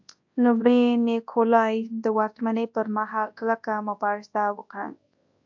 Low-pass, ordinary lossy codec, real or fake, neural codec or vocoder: 7.2 kHz; AAC, 48 kbps; fake; codec, 24 kHz, 0.9 kbps, WavTokenizer, large speech release